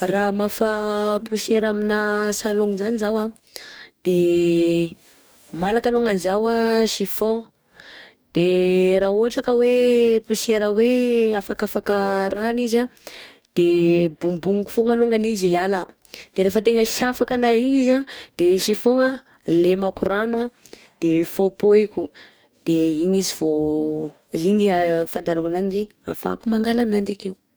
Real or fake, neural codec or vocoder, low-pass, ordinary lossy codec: fake; codec, 44.1 kHz, 2.6 kbps, DAC; none; none